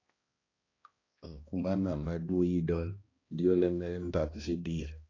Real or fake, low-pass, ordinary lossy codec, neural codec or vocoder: fake; 7.2 kHz; AAC, 32 kbps; codec, 16 kHz, 1 kbps, X-Codec, HuBERT features, trained on balanced general audio